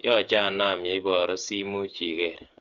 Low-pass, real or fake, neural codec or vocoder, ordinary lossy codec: 7.2 kHz; fake; codec, 16 kHz, 8 kbps, FreqCodec, smaller model; Opus, 64 kbps